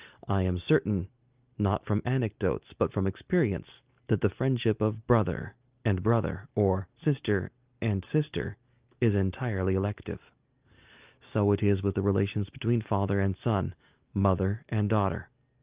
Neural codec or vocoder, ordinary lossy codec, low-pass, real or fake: none; Opus, 32 kbps; 3.6 kHz; real